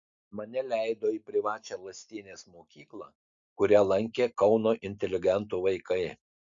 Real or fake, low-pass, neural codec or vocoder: real; 7.2 kHz; none